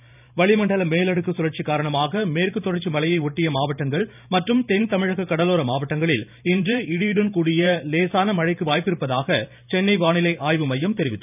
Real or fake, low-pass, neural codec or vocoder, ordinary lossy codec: fake; 3.6 kHz; vocoder, 44.1 kHz, 128 mel bands every 512 samples, BigVGAN v2; none